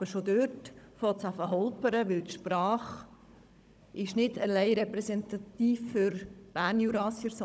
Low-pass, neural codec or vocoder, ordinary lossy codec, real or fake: none; codec, 16 kHz, 16 kbps, FunCodec, trained on Chinese and English, 50 frames a second; none; fake